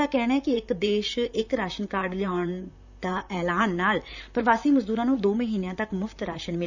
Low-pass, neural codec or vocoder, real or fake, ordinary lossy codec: 7.2 kHz; vocoder, 44.1 kHz, 128 mel bands, Pupu-Vocoder; fake; none